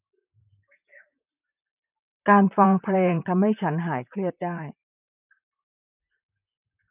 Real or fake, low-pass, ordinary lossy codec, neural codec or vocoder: fake; 3.6 kHz; none; vocoder, 44.1 kHz, 128 mel bands, Pupu-Vocoder